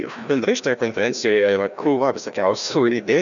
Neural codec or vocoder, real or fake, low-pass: codec, 16 kHz, 1 kbps, FreqCodec, larger model; fake; 7.2 kHz